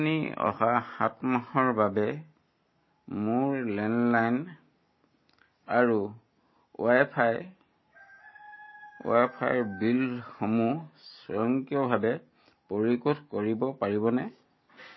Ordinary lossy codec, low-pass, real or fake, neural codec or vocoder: MP3, 24 kbps; 7.2 kHz; real; none